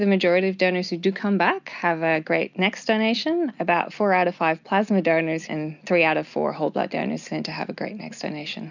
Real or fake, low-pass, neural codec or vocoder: real; 7.2 kHz; none